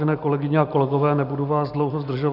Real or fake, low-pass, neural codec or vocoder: real; 5.4 kHz; none